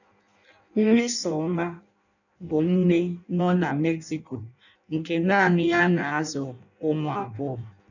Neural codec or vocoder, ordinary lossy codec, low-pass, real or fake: codec, 16 kHz in and 24 kHz out, 0.6 kbps, FireRedTTS-2 codec; none; 7.2 kHz; fake